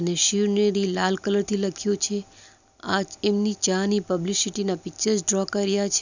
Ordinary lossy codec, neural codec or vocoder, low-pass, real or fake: none; none; 7.2 kHz; real